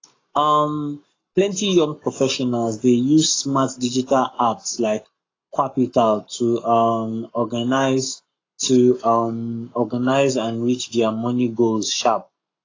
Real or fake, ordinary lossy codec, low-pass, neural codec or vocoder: fake; AAC, 32 kbps; 7.2 kHz; codec, 44.1 kHz, 7.8 kbps, Pupu-Codec